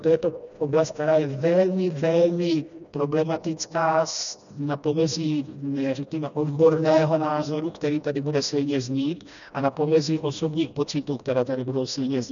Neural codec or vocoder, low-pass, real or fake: codec, 16 kHz, 1 kbps, FreqCodec, smaller model; 7.2 kHz; fake